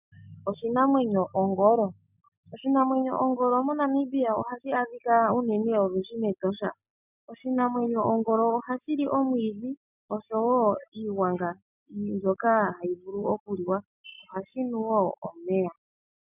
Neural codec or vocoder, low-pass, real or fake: none; 3.6 kHz; real